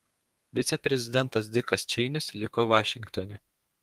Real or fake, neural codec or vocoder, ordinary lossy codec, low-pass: fake; codec, 32 kHz, 1.9 kbps, SNAC; Opus, 32 kbps; 14.4 kHz